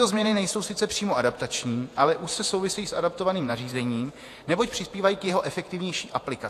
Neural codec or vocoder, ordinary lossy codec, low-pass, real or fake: vocoder, 48 kHz, 128 mel bands, Vocos; AAC, 64 kbps; 14.4 kHz; fake